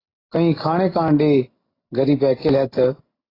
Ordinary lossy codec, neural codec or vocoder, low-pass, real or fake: AAC, 24 kbps; none; 5.4 kHz; real